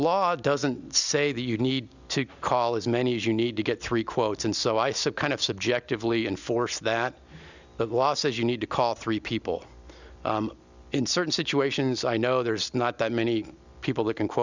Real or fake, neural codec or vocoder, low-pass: real; none; 7.2 kHz